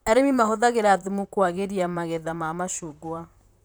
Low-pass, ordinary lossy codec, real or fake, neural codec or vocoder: none; none; fake; vocoder, 44.1 kHz, 128 mel bands, Pupu-Vocoder